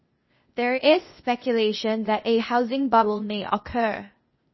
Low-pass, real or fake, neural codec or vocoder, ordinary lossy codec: 7.2 kHz; fake; codec, 16 kHz, 0.8 kbps, ZipCodec; MP3, 24 kbps